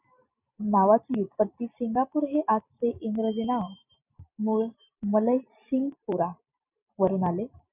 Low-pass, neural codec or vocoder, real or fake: 3.6 kHz; none; real